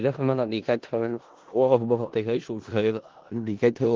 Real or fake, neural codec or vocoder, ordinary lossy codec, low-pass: fake; codec, 16 kHz in and 24 kHz out, 0.4 kbps, LongCat-Audio-Codec, four codebook decoder; Opus, 16 kbps; 7.2 kHz